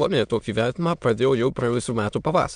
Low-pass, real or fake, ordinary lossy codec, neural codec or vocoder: 9.9 kHz; fake; MP3, 96 kbps; autoencoder, 22.05 kHz, a latent of 192 numbers a frame, VITS, trained on many speakers